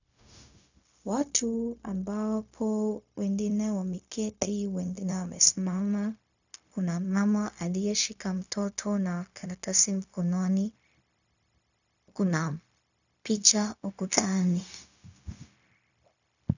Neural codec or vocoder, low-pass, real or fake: codec, 16 kHz, 0.4 kbps, LongCat-Audio-Codec; 7.2 kHz; fake